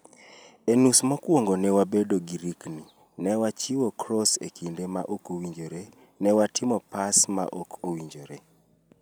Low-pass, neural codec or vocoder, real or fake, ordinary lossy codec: none; none; real; none